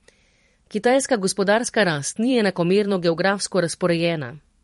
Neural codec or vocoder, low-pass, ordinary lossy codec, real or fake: vocoder, 44.1 kHz, 128 mel bands every 512 samples, BigVGAN v2; 19.8 kHz; MP3, 48 kbps; fake